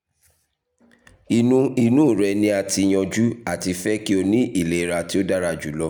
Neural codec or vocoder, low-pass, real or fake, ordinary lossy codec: none; none; real; none